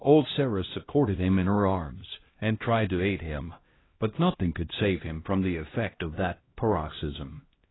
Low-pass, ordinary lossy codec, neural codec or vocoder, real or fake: 7.2 kHz; AAC, 16 kbps; codec, 16 kHz, 1 kbps, X-Codec, HuBERT features, trained on LibriSpeech; fake